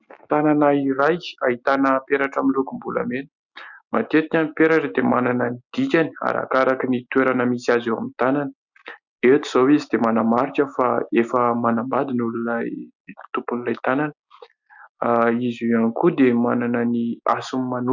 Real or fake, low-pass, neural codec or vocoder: real; 7.2 kHz; none